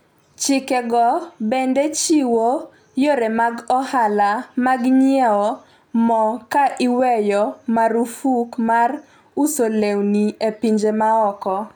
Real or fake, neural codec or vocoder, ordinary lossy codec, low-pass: real; none; none; none